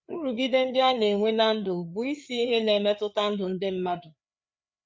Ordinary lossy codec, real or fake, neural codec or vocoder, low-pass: none; fake; codec, 16 kHz, 4 kbps, FreqCodec, larger model; none